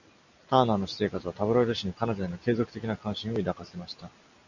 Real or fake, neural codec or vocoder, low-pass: real; none; 7.2 kHz